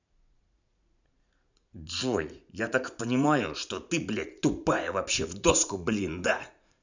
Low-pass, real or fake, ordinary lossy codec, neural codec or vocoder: 7.2 kHz; fake; none; vocoder, 44.1 kHz, 80 mel bands, Vocos